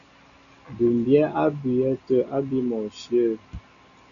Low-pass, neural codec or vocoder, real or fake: 7.2 kHz; none; real